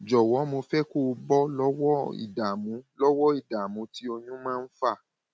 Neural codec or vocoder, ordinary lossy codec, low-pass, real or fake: none; none; none; real